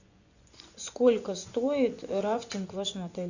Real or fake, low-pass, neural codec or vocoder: fake; 7.2 kHz; vocoder, 24 kHz, 100 mel bands, Vocos